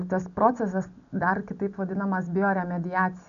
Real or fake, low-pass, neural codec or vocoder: real; 7.2 kHz; none